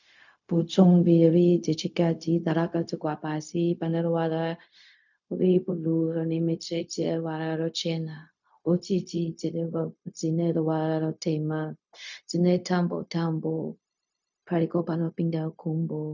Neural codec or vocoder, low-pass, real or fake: codec, 16 kHz, 0.4 kbps, LongCat-Audio-Codec; 7.2 kHz; fake